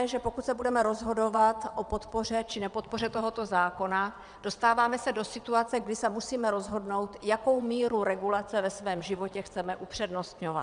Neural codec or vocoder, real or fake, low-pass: vocoder, 22.05 kHz, 80 mel bands, WaveNeXt; fake; 9.9 kHz